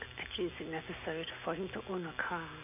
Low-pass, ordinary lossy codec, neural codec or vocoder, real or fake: 3.6 kHz; none; none; real